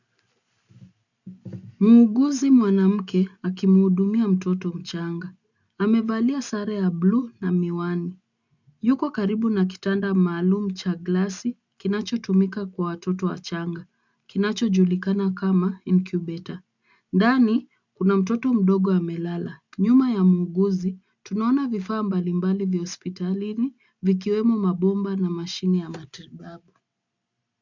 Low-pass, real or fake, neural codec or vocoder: 7.2 kHz; real; none